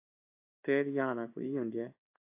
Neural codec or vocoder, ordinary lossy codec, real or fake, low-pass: codec, 16 kHz in and 24 kHz out, 1 kbps, XY-Tokenizer; AAC, 32 kbps; fake; 3.6 kHz